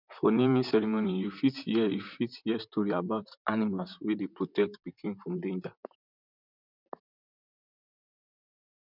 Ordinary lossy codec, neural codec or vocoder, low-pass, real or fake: none; vocoder, 44.1 kHz, 128 mel bands, Pupu-Vocoder; 5.4 kHz; fake